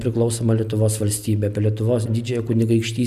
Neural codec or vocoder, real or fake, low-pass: none; real; 14.4 kHz